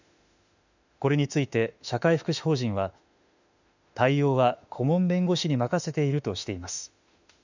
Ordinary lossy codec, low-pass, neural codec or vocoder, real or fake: none; 7.2 kHz; autoencoder, 48 kHz, 32 numbers a frame, DAC-VAE, trained on Japanese speech; fake